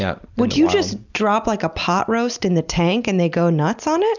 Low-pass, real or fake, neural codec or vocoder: 7.2 kHz; real; none